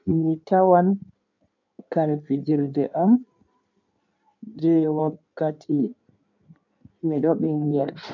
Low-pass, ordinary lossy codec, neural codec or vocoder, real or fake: 7.2 kHz; none; codec, 16 kHz in and 24 kHz out, 1.1 kbps, FireRedTTS-2 codec; fake